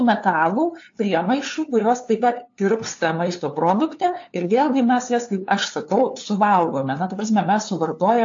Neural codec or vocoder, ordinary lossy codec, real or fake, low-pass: codec, 16 kHz, 2 kbps, FunCodec, trained on LibriTTS, 25 frames a second; AAC, 48 kbps; fake; 7.2 kHz